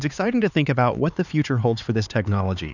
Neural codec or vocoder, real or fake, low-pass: codec, 16 kHz, 4 kbps, X-Codec, HuBERT features, trained on LibriSpeech; fake; 7.2 kHz